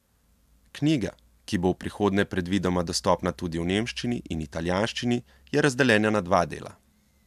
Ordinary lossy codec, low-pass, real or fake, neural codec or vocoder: MP3, 96 kbps; 14.4 kHz; real; none